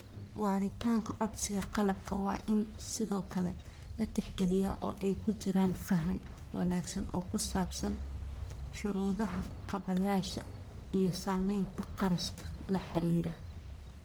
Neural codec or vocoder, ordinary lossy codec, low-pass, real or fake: codec, 44.1 kHz, 1.7 kbps, Pupu-Codec; none; none; fake